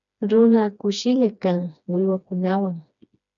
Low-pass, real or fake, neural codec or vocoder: 7.2 kHz; fake; codec, 16 kHz, 2 kbps, FreqCodec, smaller model